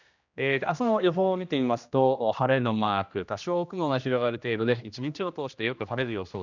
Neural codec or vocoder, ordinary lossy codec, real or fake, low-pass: codec, 16 kHz, 1 kbps, X-Codec, HuBERT features, trained on general audio; none; fake; 7.2 kHz